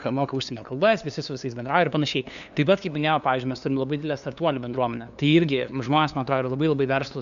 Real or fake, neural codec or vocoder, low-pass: fake; codec, 16 kHz, 2 kbps, FunCodec, trained on LibriTTS, 25 frames a second; 7.2 kHz